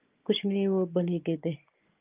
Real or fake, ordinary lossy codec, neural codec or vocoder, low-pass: real; Opus, 32 kbps; none; 3.6 kHz